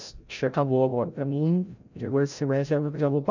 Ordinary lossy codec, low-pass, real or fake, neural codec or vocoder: none; 7.2 kHz; fake; codec, 16 kHz, 0.5 kbps, FreqCodec, larger model